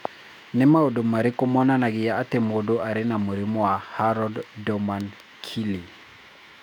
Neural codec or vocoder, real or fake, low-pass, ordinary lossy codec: autoencoder, 48 kHz, 128 numbers a frame, DAC-VAE, trained on Japanese speech; fake; 19.8 kHz; none